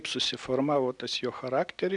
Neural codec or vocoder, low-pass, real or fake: none; 10.8 kHz; real